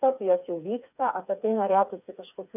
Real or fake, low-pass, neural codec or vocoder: fake; 3.6 kHz; codec, 16 kHz, 4 kbps, FreqCodec, smaller model